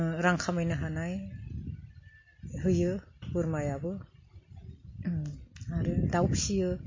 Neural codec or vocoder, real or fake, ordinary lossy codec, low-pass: none; real; MP3, 32 kbps; 7.2 kHz